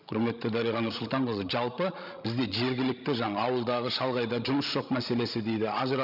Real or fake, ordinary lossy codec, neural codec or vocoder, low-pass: fake; none; codec, 16 kHz, 16 kbps, FreqCodec, larger model; 5.4 kHz